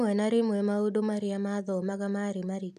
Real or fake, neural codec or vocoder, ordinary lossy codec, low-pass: real; none; none; 14.4 kHz